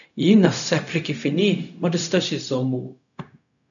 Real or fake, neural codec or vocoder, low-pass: fake; codec, 16 kHz, 0.4 kbps, LongCat-Audio-Codec; 7.2 kHz